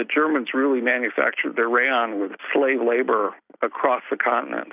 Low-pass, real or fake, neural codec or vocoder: 3.6 kHz; real; none